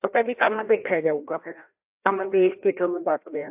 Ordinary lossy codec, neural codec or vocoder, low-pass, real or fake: AAC, 32 kbps; codec, 16 kHz, 1 kbps, FreqCodec, larger model; 3.6 kHz; fake